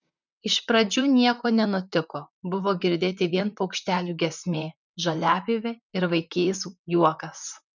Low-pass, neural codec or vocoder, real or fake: 7.2 kHz; vocoder, 44.1 kHz, 128 mel bands, Pupu-Vocoder; fake